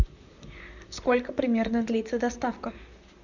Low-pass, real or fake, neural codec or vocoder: 7.2 kHz; fake; vocoder, 44.1 kHz, 128 mel bands, Pupu-Vocoder